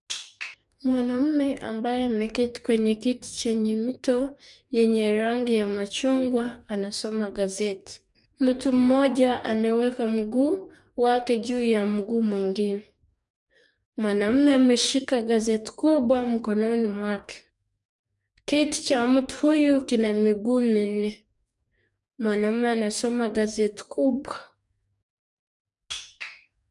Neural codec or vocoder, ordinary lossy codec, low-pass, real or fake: codec, 44.1 kHz, 2.6 kbps, DAC; none; 10.8 kHz; fake